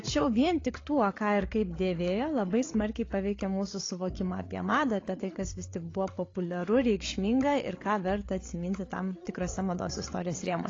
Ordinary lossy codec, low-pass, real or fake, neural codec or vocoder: AAC, 32 kbps; 7.2 kHz; fake; codec, 16 kHz, 8 kbps, FreqCodec, larger model